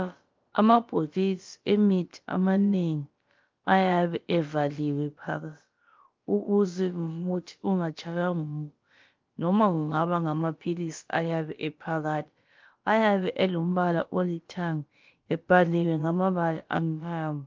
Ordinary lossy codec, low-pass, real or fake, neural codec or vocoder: Opus, 32 kbps; 7.2 kHz; fake; codec, 16 kHz, about 1 kbps, DyCAST, with the encoder's durations